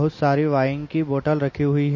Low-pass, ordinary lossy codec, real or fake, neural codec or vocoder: 7.2 kHz; MP3, 32 kbps; real; none